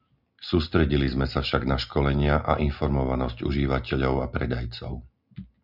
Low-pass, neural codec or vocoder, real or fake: 5.4 kHz; none; real